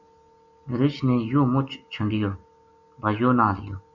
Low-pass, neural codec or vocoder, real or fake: 7.2 kHz; none; real